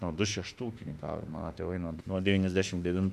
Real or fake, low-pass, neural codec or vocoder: fake; 14.4 kHz; autoencoder, 48 kHz, 32 numbers a frame, DAC-VAE, trained on Japanese speech